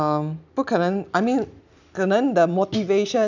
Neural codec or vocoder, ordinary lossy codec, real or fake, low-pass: none; none; real; 7.2 kHz